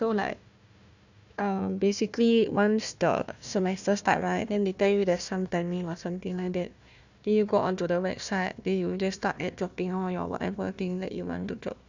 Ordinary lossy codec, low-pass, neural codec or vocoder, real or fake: none; 7.2 kHz; codec, 16 kHz, 1 kbps, FunCodec, trained on Chinese and English, 50 frames a second; fake